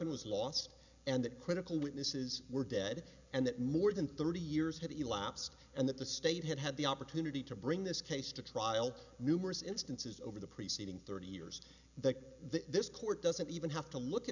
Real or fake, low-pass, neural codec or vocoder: real; 7.2 kHz; none